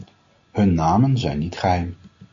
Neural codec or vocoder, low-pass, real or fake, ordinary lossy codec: none; 7.2 kHz; real; AAC, 64 kbps